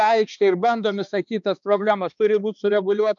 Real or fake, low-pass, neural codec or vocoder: fake; 7.2 kHz; codec, 16 kHz, 2 kbps, X-Codec, HuBERT features, trained on balanced general audio